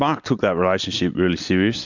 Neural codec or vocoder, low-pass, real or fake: none; 7.2 kHz; real